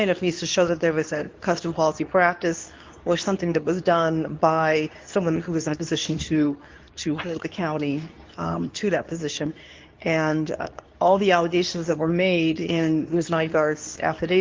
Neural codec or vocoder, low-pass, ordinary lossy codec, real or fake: codec, 24 kHz, 0.9 kbps, WavTokenizer, medium speech release version 2; 7.2 kHz; Opus, 32 kbps; fake